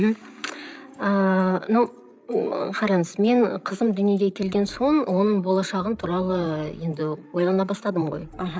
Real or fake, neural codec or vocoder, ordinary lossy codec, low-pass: fake; codec, 16 kHz, 8 kbps, FreqCodec, larger model; none; none